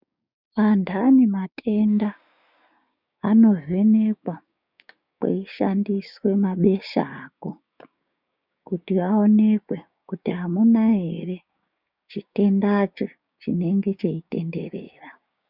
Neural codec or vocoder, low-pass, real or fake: codec, 16 kHz, 6 kbps, DAC; 5.4 kHz; fake